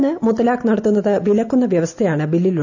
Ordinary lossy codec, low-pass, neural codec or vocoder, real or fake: none; 7.2 kHz; none; real